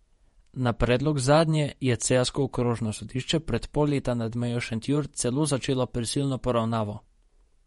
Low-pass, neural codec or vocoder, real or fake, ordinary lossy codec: 19.8 kHz; vocoder, 48 kHz, 128 mel bands, Vocos; fake; MP3, 48 kbps